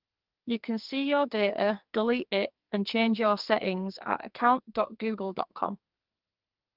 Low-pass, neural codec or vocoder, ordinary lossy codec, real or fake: 5.4 kHz; codec, 44.1 kHz, 2.6 kbps, SNAC; Opus, 24 kbps; fake